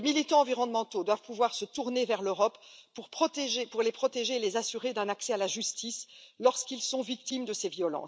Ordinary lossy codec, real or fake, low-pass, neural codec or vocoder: none; real; none; none